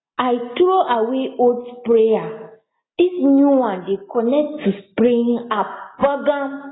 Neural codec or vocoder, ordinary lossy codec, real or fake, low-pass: none; AAC, 16 kbps; real; 7.2 kHz